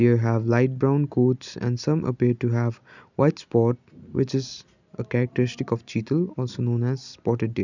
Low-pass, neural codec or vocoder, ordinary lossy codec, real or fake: 7.2 kHz; none; none; real